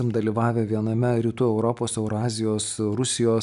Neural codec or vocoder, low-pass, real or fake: none; 10.8 kHz; real